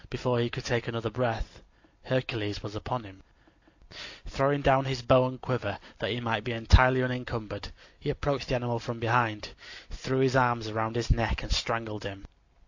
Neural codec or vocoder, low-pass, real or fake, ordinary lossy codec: none; 7.2 kHz; real; AAC, 48 kbps